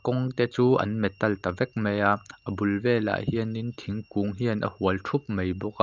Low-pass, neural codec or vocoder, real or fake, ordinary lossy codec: 7.2 kHz; none; real; Opus, 24 kbps